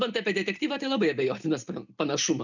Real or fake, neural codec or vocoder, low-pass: real; none; 7.2 kHz